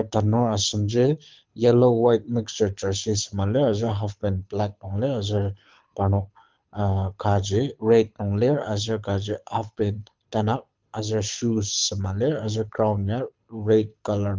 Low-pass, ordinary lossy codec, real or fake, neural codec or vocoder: 7.2 kHz; Opus, 24 kbps; fake; codec, 24 kHz, 6 kbps, HILCodec